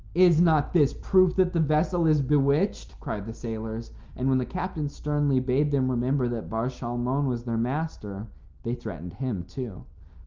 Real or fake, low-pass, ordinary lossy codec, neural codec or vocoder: real; 7.2 kHz; Opus, 32 kbps; none